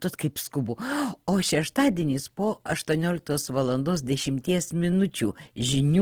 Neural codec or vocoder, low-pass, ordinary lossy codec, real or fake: none; 19.8 kHz; Opus, 16 kbps; real